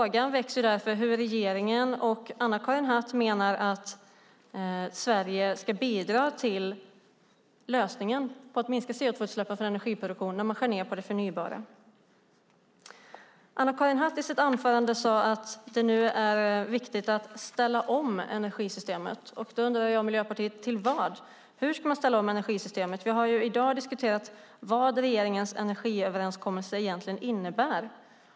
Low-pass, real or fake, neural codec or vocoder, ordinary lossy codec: none; real; none; none